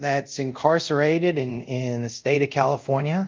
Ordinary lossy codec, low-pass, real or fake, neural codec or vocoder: Opus, 24 kbps; 7.2 kHz; fake; codec, 24 kHz, 0.5 kbps, DualCodec